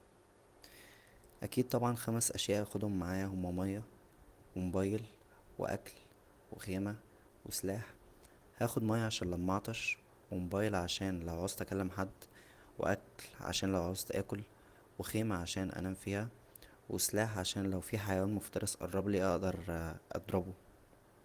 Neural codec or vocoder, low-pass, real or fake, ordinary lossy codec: none; 14.4 kHz; real; Opus, 24 kbps